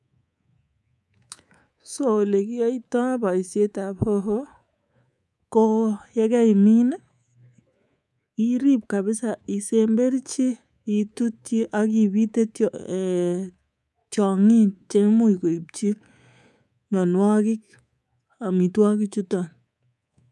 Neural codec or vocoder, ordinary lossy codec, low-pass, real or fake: codec, 24 kHz, 3.1 kbps, DualCodec; none; none; fake